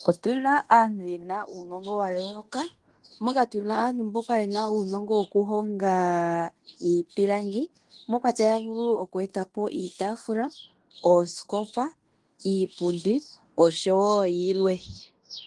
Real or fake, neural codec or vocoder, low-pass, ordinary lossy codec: fake; codec, 16 kHz in and 24 kHz out, 0.9 kbps, LongCat-Audio-Codec, fine tuned four codebook decoder; 10.8 kHz; Opus, 24 kbps